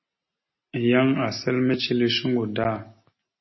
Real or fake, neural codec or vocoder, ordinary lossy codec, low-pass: real; none; MP3, 24 kbps; 7.2 kHz